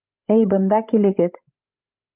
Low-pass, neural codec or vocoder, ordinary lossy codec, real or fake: 3.6 kHz; codec, 16 kHz, 16 kbps, FreqCodec, larger model; Opus, 32 kbps; fake